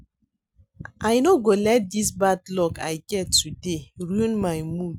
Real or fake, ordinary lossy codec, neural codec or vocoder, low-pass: real; none; none; none